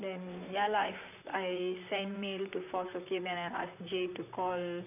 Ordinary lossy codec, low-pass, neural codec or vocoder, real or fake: none; 3.6 kHz; vocoder, 44.1 kHz, 128 mel bands, Pupu-Vocoder; fake